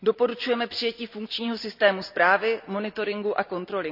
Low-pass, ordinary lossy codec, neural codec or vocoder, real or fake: 5.4 kHz; none; none; real